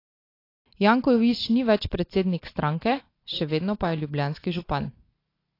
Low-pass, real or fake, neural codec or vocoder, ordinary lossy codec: 5.4 kHz; real; none; AAC, 32 kbps